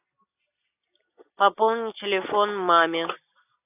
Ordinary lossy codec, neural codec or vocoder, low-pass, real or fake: AAC, 32 kbps; none; 3.6 kHz; real